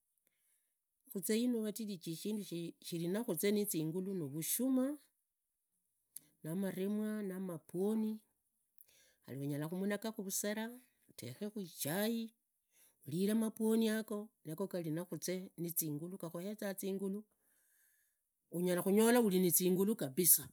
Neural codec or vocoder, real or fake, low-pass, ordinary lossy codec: none; real; none; none